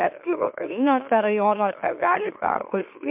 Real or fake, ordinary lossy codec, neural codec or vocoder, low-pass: fake; MP3, 32 kbps; autoencoder, 44.1 kHz, a latent of 192 numbers a frame, MeloTTS; 3.6 kHz